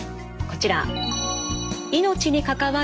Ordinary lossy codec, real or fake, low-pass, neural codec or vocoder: none; real; none; none